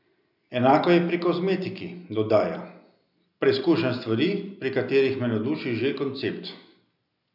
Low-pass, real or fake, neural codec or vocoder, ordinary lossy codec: 5.4 kHz; real; none; none